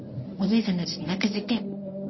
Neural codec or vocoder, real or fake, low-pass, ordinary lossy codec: codec, 24 kHz, 0.9 kbps, WavTokenizer, medium speech release version 1; fake; 7.2 kHz; MP3, 24 kbps